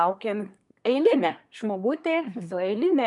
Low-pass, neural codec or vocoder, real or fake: 10.8 kHz; codec, 24 kHz, 1 kbps, SNAC; fake